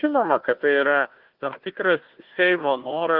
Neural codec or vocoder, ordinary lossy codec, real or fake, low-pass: codec, 16 kHz, 1 kbps, FunCodec, trained on Chinese and English, 50 frames a second; Opus, 16 kbps; fake; 5.4 kHz